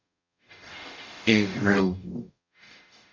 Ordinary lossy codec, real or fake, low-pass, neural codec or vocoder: MP3, 64 kbps; fake; 7.2 kHz; codec, 44.1 kHz, 0.9 kbps, DAC